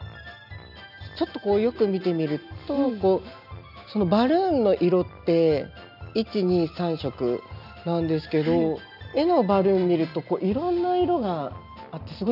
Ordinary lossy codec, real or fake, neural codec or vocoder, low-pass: none; real; none; 5.4 kHz